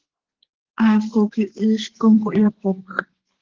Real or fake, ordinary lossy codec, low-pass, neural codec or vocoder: fake; Opus, 16 kbps; 7.2 kHz; codec, 32 kHz, 1.9 kbps, SNAC